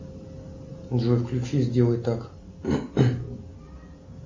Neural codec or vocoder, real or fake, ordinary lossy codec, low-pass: none; real; MP3, 32 kbps; 7.2 kHz